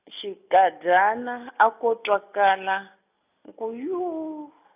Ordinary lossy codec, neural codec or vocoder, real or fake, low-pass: none; none; real; 3.6 kHz